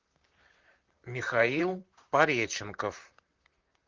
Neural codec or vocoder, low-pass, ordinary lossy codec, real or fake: vocoder, 44.1 kHz, 128 mel bands, Pupu-Vocoder; 7.2 kHz; Opus, 32 kbps; fake